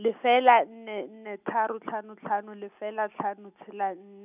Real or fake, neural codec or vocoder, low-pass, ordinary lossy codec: real; none; 3.6 kHz; none